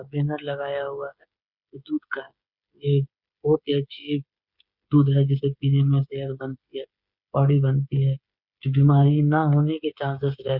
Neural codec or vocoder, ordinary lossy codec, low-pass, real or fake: codec, 16 kHz, 8 kbps, FreqCodec, smaller model; Opus, 64 kbps; 5.4 kHz; fake